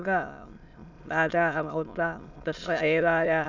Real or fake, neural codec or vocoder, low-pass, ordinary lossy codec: fake; autoencoder, 22.05 kHz, a latent of 192 numbers a frame, VITS, trained on many speakers; 7.2 kHz; none